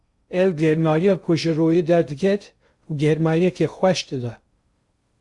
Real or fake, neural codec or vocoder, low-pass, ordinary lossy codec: fake; codec, 16 kHz in and 24 kHz out, 0.6 kbps, FocalCodec, streaming, 2048 codes; 10.8 kHz; Opus, 64 kbps